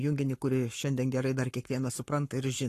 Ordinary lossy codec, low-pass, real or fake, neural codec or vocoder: AAC, 48 kbps; 14.4 kHz; fake; codec, 44.1 kHz, 7.8 kbps, Pupu-Codec